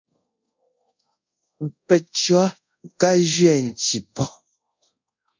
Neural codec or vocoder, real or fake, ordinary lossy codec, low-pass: codec, 24 kHz, 0.5 kbps, DualCodec; fake; MP3, 64 kbps; 7.2 kHz